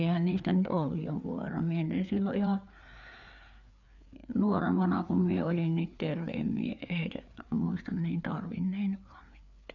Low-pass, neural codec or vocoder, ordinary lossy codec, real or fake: 7.2 kHz; codec, 16 kHz, 4 kbps, FreqCodec, larger model; none; fake